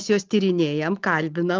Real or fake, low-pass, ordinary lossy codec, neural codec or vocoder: real; 7.2 kHz; Opus, 32 kbps; none